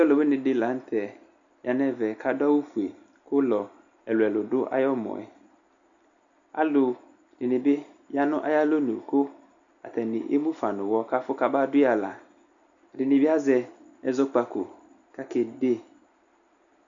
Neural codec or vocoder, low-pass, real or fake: none; 7.2 kHz; real